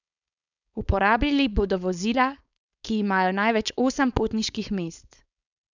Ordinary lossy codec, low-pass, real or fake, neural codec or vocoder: none; 7.2 kHz; fake; codec, 16 kHz, 4.8 kbps, FACodec